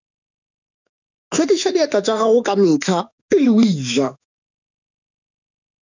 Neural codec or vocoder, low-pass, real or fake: autoencoder, 48 kHz, 32 numbers a frame, DAC-VAE, trained on Japanese speech; 7.2 kHz; fake